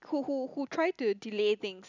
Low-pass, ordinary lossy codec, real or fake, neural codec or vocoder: 7.2 kHz; none; real; none